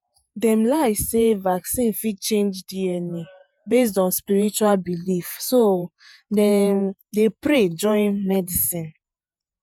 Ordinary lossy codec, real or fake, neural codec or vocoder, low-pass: none; fake; vocoder, 48 kHz, 128 mel bands, Vocos; none